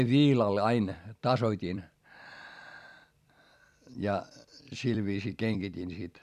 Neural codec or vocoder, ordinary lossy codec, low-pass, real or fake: vocoder, 44.1 kHz, 128 mel bands every 256 samples, BigVGAN v2; none; 14.4 kHz; fake